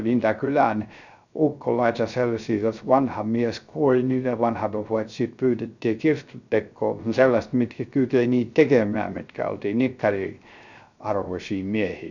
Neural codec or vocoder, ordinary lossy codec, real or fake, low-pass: codec, 16 kHz, 0.3 kbps, FocalCodec; none; fake; 7.2 kHz